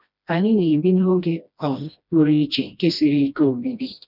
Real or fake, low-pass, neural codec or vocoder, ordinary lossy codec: fake; 5.4 kHz; codec, 16 kHz, 1 kbps, FreqCodec, smaller model; none